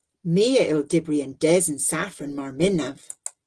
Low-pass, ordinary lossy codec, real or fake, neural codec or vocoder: 9.9 kHz; Opus, 16 kbps; real; none